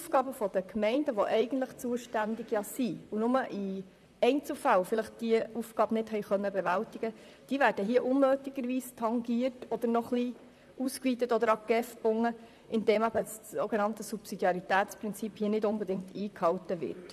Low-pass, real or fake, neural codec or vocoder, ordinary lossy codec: 14.4 kHz; fake; vocoder, 44.1 kHz, 128 mel bands, Pupu-Vocoder; none